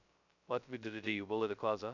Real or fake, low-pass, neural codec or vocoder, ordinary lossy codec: fake; 7.2 kHz; codec, 16 kHz, 0.2 kbps, FocalCodec; none